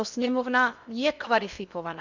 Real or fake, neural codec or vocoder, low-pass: fake; codec, 16 kHz in and 24 kHz out, 0.6 kbps, FocalCodec, streaming, 2048 codes; 7.2 kHz